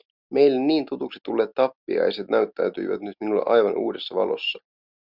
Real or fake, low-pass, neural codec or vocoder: real; 5.4 kHz; none